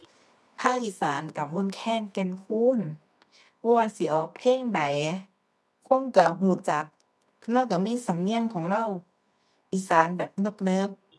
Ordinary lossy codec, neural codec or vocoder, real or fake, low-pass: none; codec, 24 kHz, 0.9 kbps, WavTokenizer, medium music audio release; fake; none